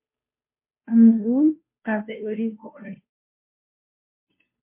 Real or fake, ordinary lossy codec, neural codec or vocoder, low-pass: fake; MP3, 24 kbps; codec, 16 kHz, 0.5 kbps, FunCodec, trained on Chinese and English, 25 frames a second; 3.6 kHz